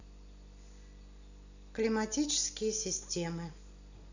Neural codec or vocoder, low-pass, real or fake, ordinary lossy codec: none; 7.2 kHz; real; none